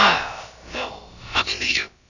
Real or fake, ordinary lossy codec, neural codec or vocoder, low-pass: fake; none; codec, 16 kHz, about 1 kbps, DyCAST, with the encoder's durations; 7.2 kHz